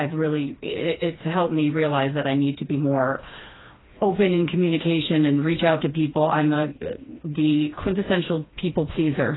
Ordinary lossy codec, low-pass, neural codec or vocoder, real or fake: AAC, 16 kbps; 7.2 kHz; codec, 16 kHz, 2 kbps, FreqCodec, smaller model; fake